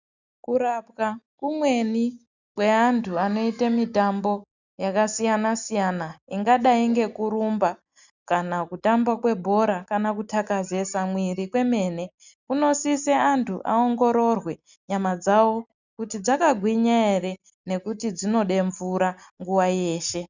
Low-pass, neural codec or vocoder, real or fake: 7.2 kHz; none; real